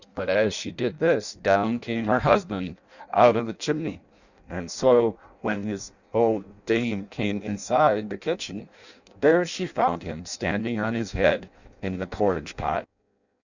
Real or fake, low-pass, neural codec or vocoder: fake; 7.2 kHz; codec, 16 kHz in and 24 kHz out, 0.6 kbps, FireRedTTS-2 codec